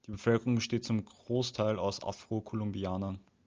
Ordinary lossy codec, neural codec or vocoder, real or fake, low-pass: Opus, 24 kbps; none; real; 7.2 kHz